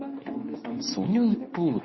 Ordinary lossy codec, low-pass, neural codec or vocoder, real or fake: MP3, 24 kbps; 7.2 kHz; codec, 24 kHz, 0.9 kbps, WavTokenizer, medium speech release version 2; fake